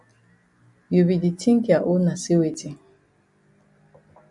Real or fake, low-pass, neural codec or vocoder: real; 10.8 kHz; none